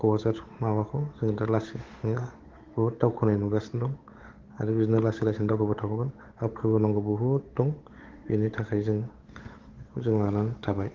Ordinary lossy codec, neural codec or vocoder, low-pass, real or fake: Opus, 16 kbps; codec, 16 kHz, 8 kbps, FunCodec, trained on Chinese and English, 25 frames a second; 7.2 kHz; fake